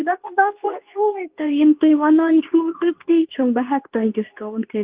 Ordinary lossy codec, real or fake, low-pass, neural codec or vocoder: Opus, 32 kbps; fake; 3.6 kHz; codec, 24 kHz, 0.9 kbps, WavTokenizer, medium speech release version 2